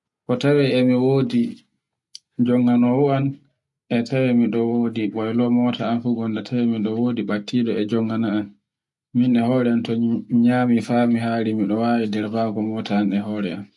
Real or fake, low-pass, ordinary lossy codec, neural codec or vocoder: real; 10.8 kHz; none; none